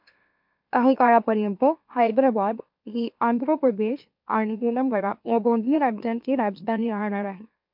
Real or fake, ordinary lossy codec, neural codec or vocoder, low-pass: fake; MP3, 48 kbps; autoencoder, 44.1 kHz, a latent of 192 numbers a frame, MeloTTS; 5.4 kHz